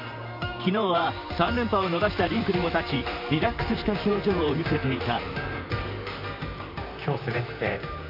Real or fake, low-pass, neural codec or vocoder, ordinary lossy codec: fake; 5.4 kHz; vocoder, 44.1 kHz, 128 mel bands, Pupu-Vocoder; none